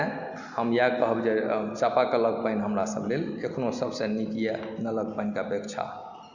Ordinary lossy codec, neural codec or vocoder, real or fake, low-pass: Opus, 64 kbps; none; real; 7.2 kHz